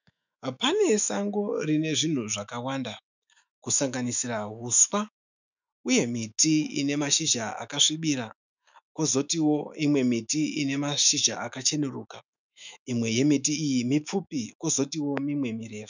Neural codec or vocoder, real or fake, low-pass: autoencoder, 48 kHz, 128 numbers a frame, DAC-VAE, trained on Japanese speech; fake; 7.2 kHz